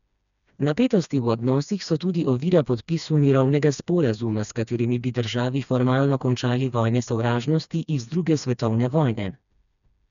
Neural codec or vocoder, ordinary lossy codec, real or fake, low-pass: codec, 16 kHz, 2 kbps, FreqCodec, smaller model; none; fake; 7.2 kHz